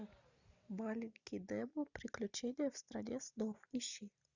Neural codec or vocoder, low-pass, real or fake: none; 7.2 kHz; real